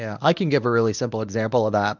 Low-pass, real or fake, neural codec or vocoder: 7.2 kHz; fake; codec, 24 kHz, 0.9 kbps, WavTokenizer, medium speech release version 1